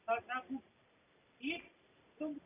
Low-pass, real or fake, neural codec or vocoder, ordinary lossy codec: 3.6 kHz; real; none; none